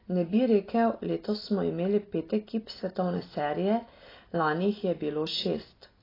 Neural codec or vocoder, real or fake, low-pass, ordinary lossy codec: none; real; 5.4 kHz; AAC, 24 kbps